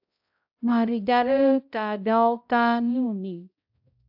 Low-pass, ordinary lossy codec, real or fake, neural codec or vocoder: 5.4 kHz; AAC, 48 kbps; fake; codec, 16 kHz, 0.5 kbps, X-Codec, HuBERT features, trained on balanced general audio